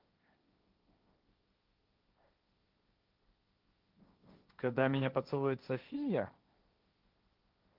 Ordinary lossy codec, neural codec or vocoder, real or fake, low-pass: Opus, 32 kbps; codec, 16 kHz, 1.1 kbps, Voila-Tokenizer; fake; 5.4 kHz